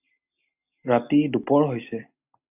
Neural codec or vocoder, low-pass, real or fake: none; 3.6 kHz; real